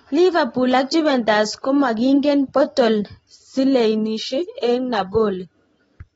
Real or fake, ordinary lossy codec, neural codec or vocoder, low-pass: real; AAC, 24 kbps; none; 19.8 kHz